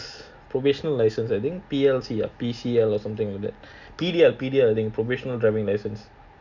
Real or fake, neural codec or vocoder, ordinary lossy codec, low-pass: real; none; none; 7.2 kHz